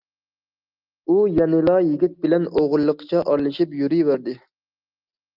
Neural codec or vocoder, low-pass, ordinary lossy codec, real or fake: none; 5.4 kHz; Opus, 32 kbps; real